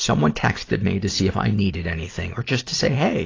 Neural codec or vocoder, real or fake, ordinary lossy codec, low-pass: none; real; AAC, 32 kbps; 7.2 kHz